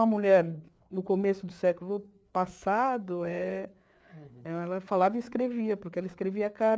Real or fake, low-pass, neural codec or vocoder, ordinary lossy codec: fake; none; codec, 16 kHz, 4 kbps, FreqCodec, larger model; none